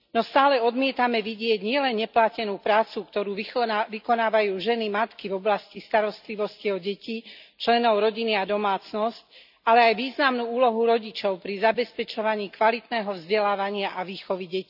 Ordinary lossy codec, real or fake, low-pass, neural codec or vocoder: none; real; 5.4 kHz; none